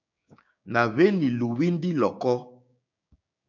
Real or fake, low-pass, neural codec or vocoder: fake; 7.2 kHz; codec, 16 kHz, 6 kbps, DAC